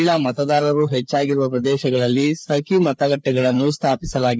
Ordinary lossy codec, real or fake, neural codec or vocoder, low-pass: none; fake; codec, 16 kHz, 4 kbps, FreqCodec, larger model; none